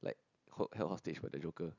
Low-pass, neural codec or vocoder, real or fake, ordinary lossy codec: 7.2 kHz; none; real; none